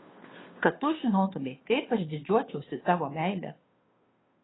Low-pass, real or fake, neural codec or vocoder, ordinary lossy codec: 7.2 kHz; fake; codec, 16 kHz, 2 kbps, FunCodec, trained on Chinese and English, 25 frames a second; AAC, 16 kbps